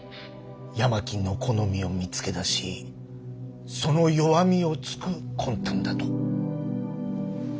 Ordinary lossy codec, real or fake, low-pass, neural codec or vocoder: none; real; none; none